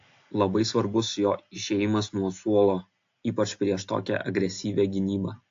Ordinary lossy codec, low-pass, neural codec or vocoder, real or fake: AAC, 48 kbps; 7.2 kHz; none; real